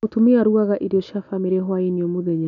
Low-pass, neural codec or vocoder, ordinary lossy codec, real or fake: 7.2 kHz; none; none; real